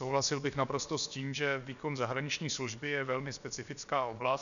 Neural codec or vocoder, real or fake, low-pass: codec, 16 kHz, about 1 kbps, DyCAST, with the encoder's durations; fake; 7.2 kHz